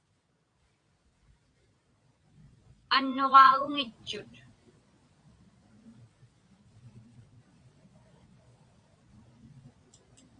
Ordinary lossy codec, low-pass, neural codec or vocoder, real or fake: AAC, 48 kbps; 9.9 kHz; vocoder, 22.05 kHz, 80 mel bands, Vocos; fake